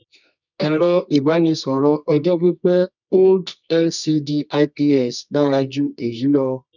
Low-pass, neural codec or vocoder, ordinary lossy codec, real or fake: 7.2 kHz; codec, 24 kHz, 0.9 kbps, WavTokenizer, medium music audio release; none; fake